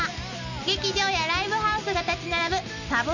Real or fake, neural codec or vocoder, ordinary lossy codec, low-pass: real; none; none; 7.2 kHz